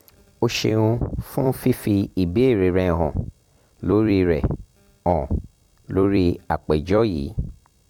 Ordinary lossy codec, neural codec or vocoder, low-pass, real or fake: MP3, 96 kbps; vocoder, 44.1 kHz, 128 mel bands every 256 samples, BigVGAN v2; 19.8 kHz; fake